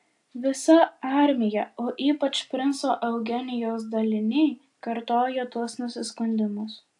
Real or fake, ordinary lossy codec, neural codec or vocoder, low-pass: real; AAC, 64 kbps; none; 10.8 kHz